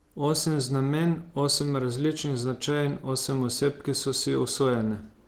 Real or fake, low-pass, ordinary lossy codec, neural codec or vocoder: real; 19.8 kHz; Opus, 16 kbps; none